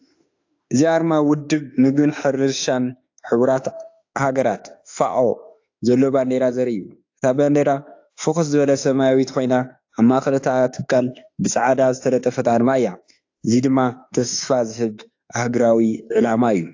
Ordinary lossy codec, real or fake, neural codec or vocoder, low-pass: AAC, 48 kbps; fake; autoencoder, 48 kHz, 32 numbers a frame, DAC-VAE, trained on Japanese speech; 7.2 kHz